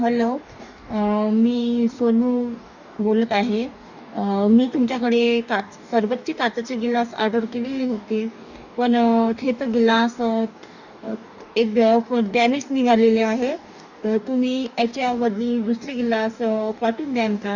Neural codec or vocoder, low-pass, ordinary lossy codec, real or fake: codec, 44.1 kHz, 2.6 kbps, DAC; 7.2 kHz; none; fake